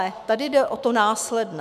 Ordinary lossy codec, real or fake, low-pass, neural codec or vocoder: MP3, 96 kbps; fake; 14.4 kHz; autoencoder, 48 kHz, 128 numbers a frame, DAC-VAE, trained on Japanese speech